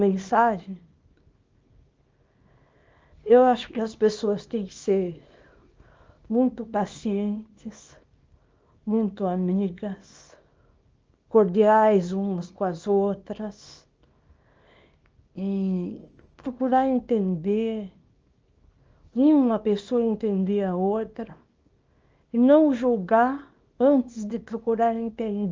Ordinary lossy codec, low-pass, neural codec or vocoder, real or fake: Opus, 24 kbps; 7.2 kHz; codec, 24 kHz, 0.9 kbps, WavTokenizer, small release; fake